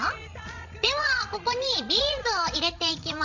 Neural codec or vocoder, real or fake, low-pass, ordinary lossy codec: codec, 16 kHz, 16 kbps, FreqCodec, larger model; fake; 7.2 kHz; none